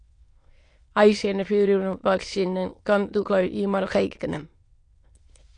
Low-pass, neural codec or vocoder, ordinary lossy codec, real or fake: 9.9 kHz; autoencoder, 22.05 kHz, a latent of 192 numbers a frame, VITS, trained on many speakers; AAC, 48 kbps; fake